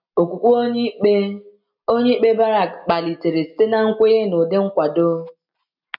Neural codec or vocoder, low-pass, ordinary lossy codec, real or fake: none; 5.4 kHz; none; real